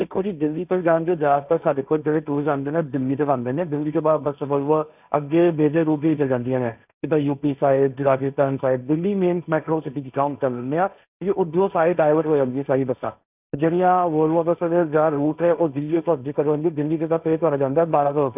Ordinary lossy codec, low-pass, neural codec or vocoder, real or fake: none; 3.6 kHz; codec, 16 kHz, 1.1 kbps, Voila-Tokenizer; fake